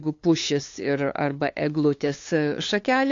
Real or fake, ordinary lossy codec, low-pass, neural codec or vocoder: fake; AAC, 48 kbps; 7.2 kHz; codec, 16 kHz, 2 kbps, FunCodec, trained on LibriTTS, 25 frames a second